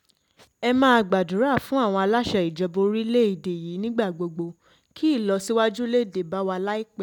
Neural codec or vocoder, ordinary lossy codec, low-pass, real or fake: none; none; 19.8 kHz; real